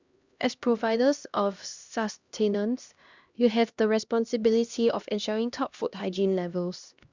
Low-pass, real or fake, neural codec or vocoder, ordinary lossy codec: 7.2 kHz; fake; codec, 16 kHz, 1 kbps, X-Codec, HuBERT features, trained on LibriSpeech; Opus, 64 kbps